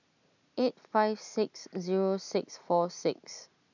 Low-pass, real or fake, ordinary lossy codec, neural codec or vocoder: 7.2 kHz; real; none; none